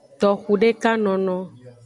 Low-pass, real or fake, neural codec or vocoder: 10.8 kHz; real; none